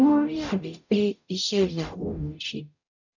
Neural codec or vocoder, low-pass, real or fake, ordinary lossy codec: codec, 44.1 kHz, 0.9 kbps, DAC; 7.2 kHz; fake; none